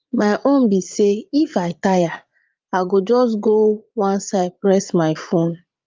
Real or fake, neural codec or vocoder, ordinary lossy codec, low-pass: fake; vocoder, 44.1 kHz, 80 mel bands, Vocos; Opus, 24 kbps; 7.2 kHz